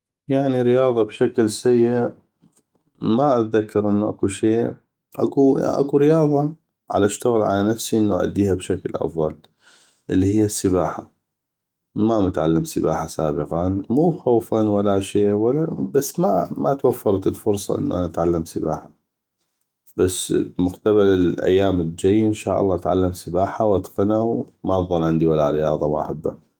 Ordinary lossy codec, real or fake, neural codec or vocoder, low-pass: Opus, 32 kbps; fake; autoencoder, 48 kHz, 128 numbers a frame, DAC-VAE, trained on Japanese speech; 19.8 kHz